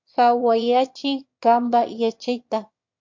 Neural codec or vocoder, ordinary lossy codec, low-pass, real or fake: autoencoder, 22.05 kHz, a latent of 192 numbers a frame, VITS, trained on one speaker; MP3, 48 kbps; 7.2 kHz; fake